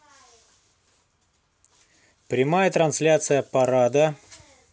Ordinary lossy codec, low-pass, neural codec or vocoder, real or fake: none; none; none; real